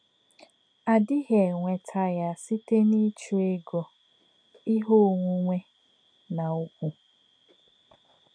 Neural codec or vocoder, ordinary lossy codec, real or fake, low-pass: none; none; real; 9.9 kHz